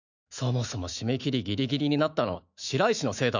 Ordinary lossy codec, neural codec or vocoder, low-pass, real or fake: none; autoencoder, 48 kHz, 128 numbers a frame, DAC-VAE, trained on Japanese speech; 7.2 kHz; fake